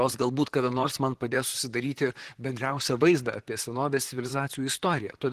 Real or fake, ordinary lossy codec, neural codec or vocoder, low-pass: fake; Opus, 16 kbps; vocoder, 44.1 kHz, 128 mel bands, Pupu-Vocoder; 14.4 kHz